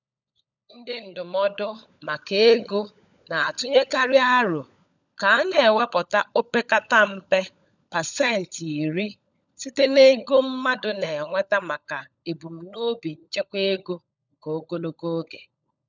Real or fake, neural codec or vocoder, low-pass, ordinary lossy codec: fake; codec, 16 kHz, 16 kbps, FunCodec, trained on LibriTTS, 50 frames a second; 7.2 kHz; none